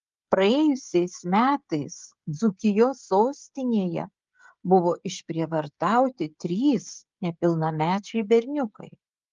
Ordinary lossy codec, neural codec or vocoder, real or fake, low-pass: Opus, 32 kbps; codec, 16 kHz, 16 kbps, FreqCodec, smaller model; fake; 7.2 kHz